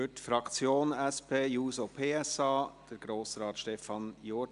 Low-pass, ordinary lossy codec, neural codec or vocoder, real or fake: 10.8 kHz; none; none; real